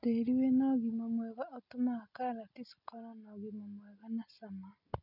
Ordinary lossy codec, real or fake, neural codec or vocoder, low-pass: none; real; none; 5.4 kHz